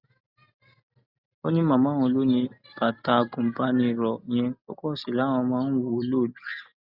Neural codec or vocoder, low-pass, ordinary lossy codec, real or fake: none; 5.4 kHz; Opus, 64 kbps; real